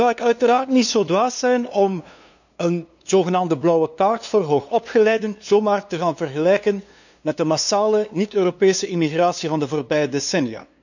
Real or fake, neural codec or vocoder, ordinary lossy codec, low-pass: fake; codec, 16 kHz, 2 kbps, FunCodec, trained on LibriTTS, 25 frames a second; none; 7.2 kHz